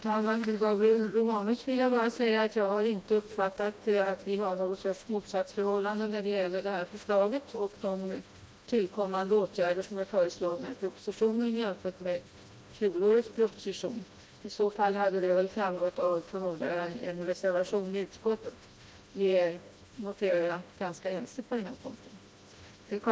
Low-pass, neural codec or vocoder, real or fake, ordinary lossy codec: none; codec, 16 kHz, 1 kbps, FreqCodec, smaller model; fake; none